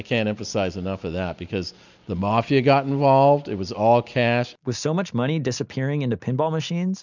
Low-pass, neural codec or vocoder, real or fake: 7.2 kHz; none; real